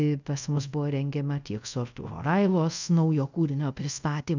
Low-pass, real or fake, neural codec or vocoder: 7.2 kHz; fake; codec, 24 kHz, 0.5 kbps, DualCodec